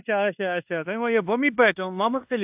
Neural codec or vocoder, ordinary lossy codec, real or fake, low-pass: codec, 16 kHz in and 24 kHz out, 0.9 kbps, LongCat-Audio-Codec, fine tuned four codebook decoder; AAC, 32 kbps; fake; 3.6 kHz